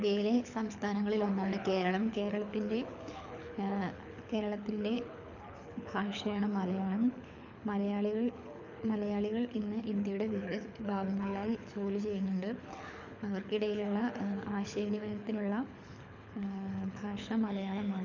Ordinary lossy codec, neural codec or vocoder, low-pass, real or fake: none; codec, 24 kHz, 6 kbps, HILCodec; 7.2 kHz; fake